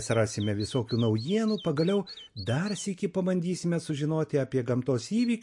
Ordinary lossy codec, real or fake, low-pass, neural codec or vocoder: MP3, 48 kbps; real; 10.8 kHz; none